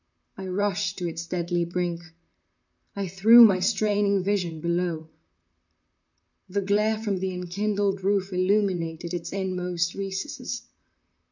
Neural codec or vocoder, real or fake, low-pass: vocoder, 44.1 kHz, 128 mel bands, Pupu-Vocoder; fake; 7.2 kHz